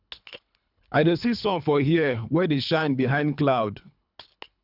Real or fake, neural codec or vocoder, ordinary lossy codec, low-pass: fake; codec, 24 kHz, 3 kbps, HILCodec; none; 5.4 kHz